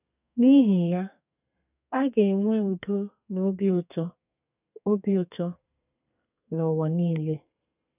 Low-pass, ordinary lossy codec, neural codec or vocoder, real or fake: 3.6 kHz; none; codec, 32 kHz, 1.9 kbps, SNAC; fake